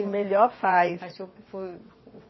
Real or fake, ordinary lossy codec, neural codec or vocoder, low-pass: fake; MP3, 24 kbps; vocoder, 22.05 kHz, 80 mel bands, WaveNeXt; 7.2 kHz